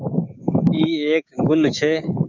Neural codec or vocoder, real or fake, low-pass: codec, 16 kHz, 6 kbps, DAC; fake; 7.2 kHz